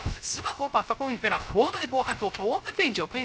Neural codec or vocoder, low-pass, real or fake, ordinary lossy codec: codec, 16 kHz, 0.3 kbps, FocalCodec; none; fake; none